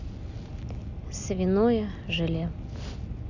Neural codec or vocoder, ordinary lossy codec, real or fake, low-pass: none; none; real; 7.2 kHz